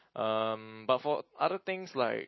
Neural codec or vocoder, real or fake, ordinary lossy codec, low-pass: none; real; MP3, 24 kbps; 7.2 kHz